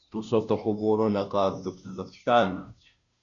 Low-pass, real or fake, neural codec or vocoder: 7.2 kHz; fake; codec, 16 kHz, 0.5 kbps, FunCodec, trained on Chinese and English, 25 frames a second